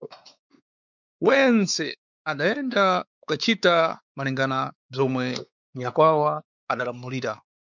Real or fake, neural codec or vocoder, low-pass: fake; codec, 16 kHz, 4 kbps, X-Codec, WavLM features, trained on Multilingual LibriSpeech; 7.2 kHz